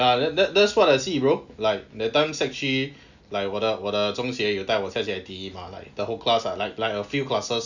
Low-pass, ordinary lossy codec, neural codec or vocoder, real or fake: 7.2 kHz; none; none; real